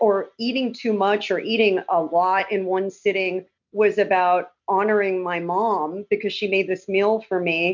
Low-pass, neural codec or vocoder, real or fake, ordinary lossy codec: 7.2 kHz; none; real; MP3, 48 kbps